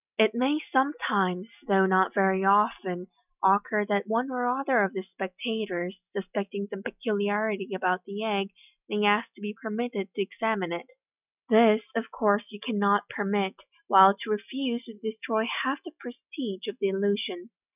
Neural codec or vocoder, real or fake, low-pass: none; real; 3.6 kHz